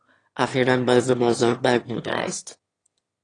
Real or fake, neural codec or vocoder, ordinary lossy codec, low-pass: fake; autoencoder, 22.05 kHz, a latent of 192 numbers a frame, VITS, trained on one speaker; AAC, 32 kbps; 9.9 kHz